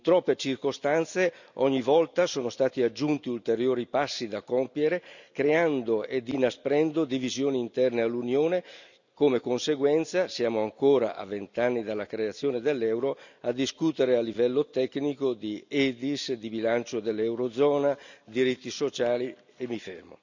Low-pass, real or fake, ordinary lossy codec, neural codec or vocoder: 7.2 kHz; real; none; none